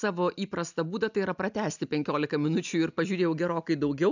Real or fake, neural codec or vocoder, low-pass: real; none; 7.2 kHz